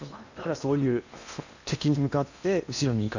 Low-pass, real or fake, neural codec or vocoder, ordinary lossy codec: 7.2 kHz; fake; codec, 16 kHz in and 24 kHz out, 0.8 kbps, FocalCodec, streaming, 65536 codes; none